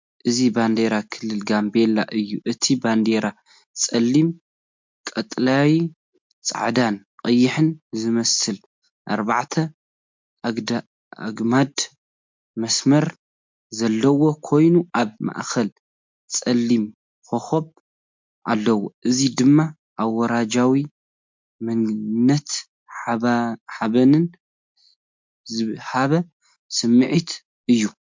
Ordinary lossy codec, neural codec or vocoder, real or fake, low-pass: MP3, 64 kbps; none; real; 7.2 kHz